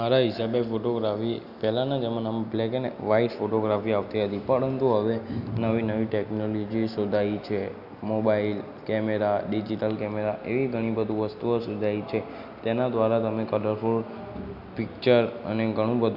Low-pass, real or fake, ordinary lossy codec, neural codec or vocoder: 5.4 kHz; real; none; none